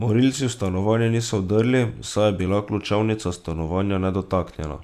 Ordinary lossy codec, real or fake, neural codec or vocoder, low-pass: none; real; none; 14.4 kHz